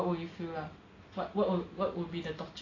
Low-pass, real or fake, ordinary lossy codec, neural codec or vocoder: 7.2 kHz; real; AAC, 32 kbps; none